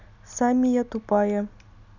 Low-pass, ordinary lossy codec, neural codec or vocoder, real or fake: 7.2 kHz; none; none; real